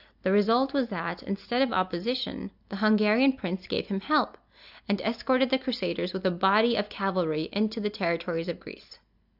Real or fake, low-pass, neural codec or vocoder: real; 5.4 kHz; none